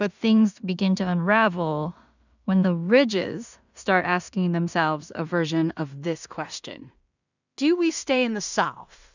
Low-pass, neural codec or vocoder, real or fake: 7.2 kHz; codec, 16 kHz in and 24 kHz out, 0.4 kbps, LongCat-Audio-Codec, two codebook decoder; fake